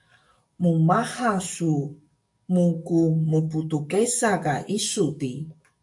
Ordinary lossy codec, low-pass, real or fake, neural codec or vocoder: MP3, 96 kbps; 10.8 kHz; fake; codec, 44.1 kHz, 7.8 kbps, DAC